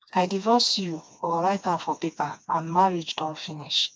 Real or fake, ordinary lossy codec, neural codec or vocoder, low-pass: fake; none; codec, 16 kHz, 2 kbps, FreqCodec, smaller model; none